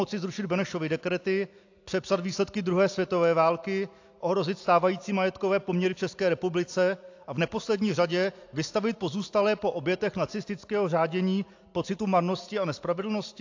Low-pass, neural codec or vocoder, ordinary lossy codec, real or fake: 7.2 kHz; none; AAC, 48 kbps; real